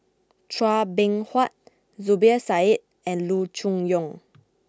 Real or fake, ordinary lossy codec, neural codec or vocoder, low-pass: real; none; none; none